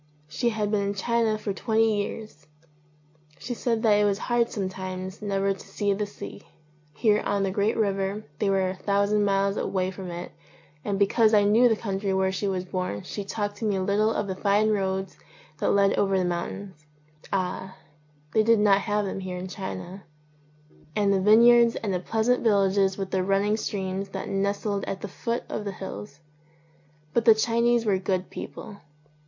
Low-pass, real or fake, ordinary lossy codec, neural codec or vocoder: 7.2 kHz; real; MP3, 48 kbps; none